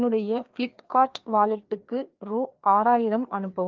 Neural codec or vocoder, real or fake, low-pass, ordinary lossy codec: codec, 16 kHz, 2 kbps, FreqCodec, larger model; fake; 7.2 kHz; Opus, 32 kbps